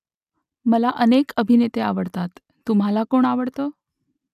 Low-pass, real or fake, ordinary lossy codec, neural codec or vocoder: 14.4 kHz; real; none; none